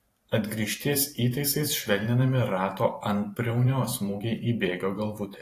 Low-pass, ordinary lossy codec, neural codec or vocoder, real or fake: 14.4 kHz; AAC, 48 kbps; vocoder, 44.1 kHz, 128 mel bands every 512 samples, BigVGAN v2; fake